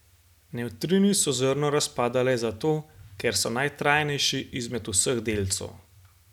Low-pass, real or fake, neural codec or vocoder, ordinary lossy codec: 19.8 kHz; real; none; none